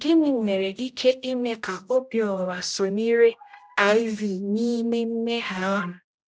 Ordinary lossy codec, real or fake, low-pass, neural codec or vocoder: none; fake; none; codec, 16 kHz, 0.5 kbps, X-Codec, HuBERT features, trained on general audio